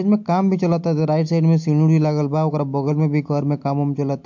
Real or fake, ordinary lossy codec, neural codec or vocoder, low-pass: real; MP3, 48 kbps; none; 7.2 kHz